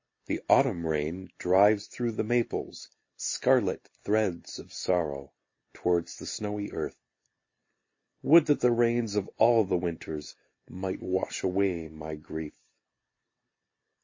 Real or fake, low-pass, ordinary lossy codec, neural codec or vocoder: real; 7.2 kHz; MP3, 32 kbps; none